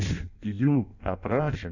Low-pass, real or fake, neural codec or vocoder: 7.2 kHz; fake; codec, 16 kHz in and 24 kHz out, 0.6 kbps, FireRedTTS-2 codec